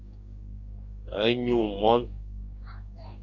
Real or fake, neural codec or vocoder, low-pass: fake; codec, 44.1 kHz, 2.6 kbps, DAC; 7.2 kHz